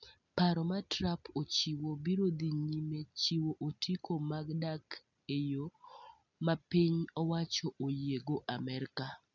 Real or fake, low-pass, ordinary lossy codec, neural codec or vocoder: real; 7.2 kHz; none; none